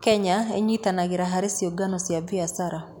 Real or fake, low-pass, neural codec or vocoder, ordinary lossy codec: real; none; none; none